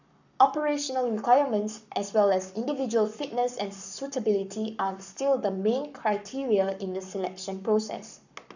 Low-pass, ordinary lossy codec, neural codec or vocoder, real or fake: 7.2 kHz; none; codec, 44.1 kHz, 7.8 kbps, Pupu-Codec; fake